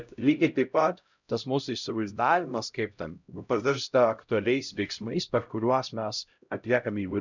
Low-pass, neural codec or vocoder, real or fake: 7.2 kHz; codec, 16 kHz, 0.5 kbps, X-Codec, HuBERT features, trained on LibriSpeech; fake